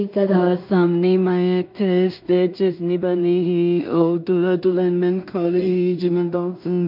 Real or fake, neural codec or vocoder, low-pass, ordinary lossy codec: fake; codec, 16 kHz in and 24 kHz out, 0.4 kbps, LongCat-Audio-Codec, two codebook decoder; 5.4 kHz; MP3, 48 kbps